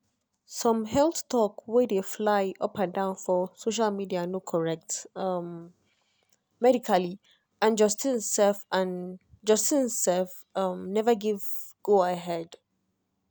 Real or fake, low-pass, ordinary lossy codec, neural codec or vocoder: real; none; none; none